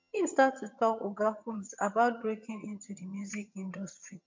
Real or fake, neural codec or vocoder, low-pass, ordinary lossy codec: fake; vocoder, 22.05 kHz, 80 mel bands, HiFi-GAN; 7.2 kHz; MP3, 48 kbps